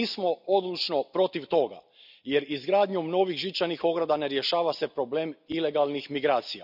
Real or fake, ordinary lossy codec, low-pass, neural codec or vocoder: real; none; 5.4 kHz; none